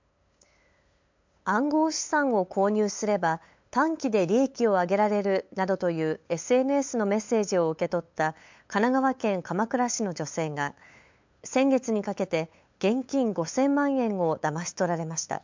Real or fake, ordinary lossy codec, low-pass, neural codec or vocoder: fake; MP3, 64 kbps; 7.2 kHz; codec, 16 kHz, 8 kbps, FunCodec, trained on LibriTTS, 25 frames a second